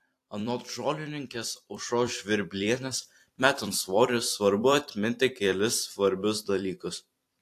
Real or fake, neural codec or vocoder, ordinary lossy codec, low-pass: fake; vocoder, 44.1 kHz, 128 mel bands every 256 samples, BigVGAN v2; AAC, 64 kbps; 14.4 kHz